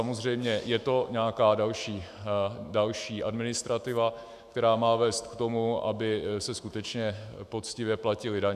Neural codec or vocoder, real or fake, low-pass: autoencoder, 48 kHz, 128 numbers a frame, DAC-VAE, trained on Japanese speech; fake; 14.4 kHz